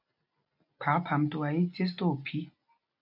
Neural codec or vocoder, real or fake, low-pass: none; real; 5.4 kHz